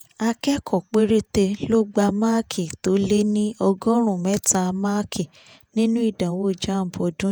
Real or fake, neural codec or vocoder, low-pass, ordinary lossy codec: fake; vocoder, 48 kHz, 128 mel bands, Vocos; none; none